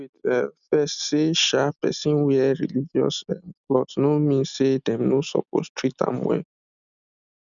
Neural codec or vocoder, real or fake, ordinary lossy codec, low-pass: none; real; none; 7.2 kHz